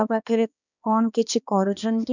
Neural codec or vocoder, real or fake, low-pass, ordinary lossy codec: codec, 16 kHz, 2 kbps, X-Codec, HuBERT features, trained on balanced general audio; fake; 7.2 kHz; none